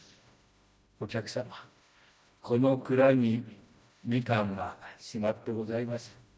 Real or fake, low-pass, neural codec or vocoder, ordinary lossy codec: fake; none; codec, 16 kHz, 1 kbps, FreqCodec, smaller model; none